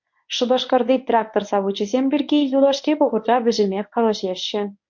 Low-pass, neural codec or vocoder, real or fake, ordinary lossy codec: 7.2 kHz; codec, 24 kHz, 0.9 kbps, WavTokenizer, medium speech release version 1; fake; MP3, 48 kbps